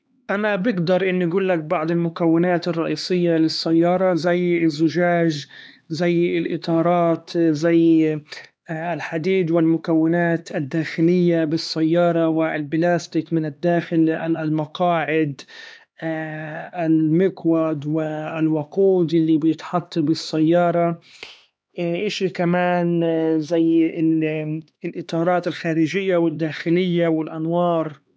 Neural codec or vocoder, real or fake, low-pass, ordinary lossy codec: codec, 16 kHz, 2 kbps, X-Codec, HuBERT features, trained on LibriSpeech; fake; none; none